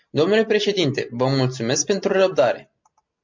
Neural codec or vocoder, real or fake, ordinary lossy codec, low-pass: none; real; MP3, 48 kbps; 7.2 kHz